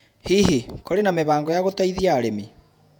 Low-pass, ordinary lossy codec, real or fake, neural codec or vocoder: 19.8 kHz; none; fake; vocoder, 48 kHz, 128 mel bands, Vocos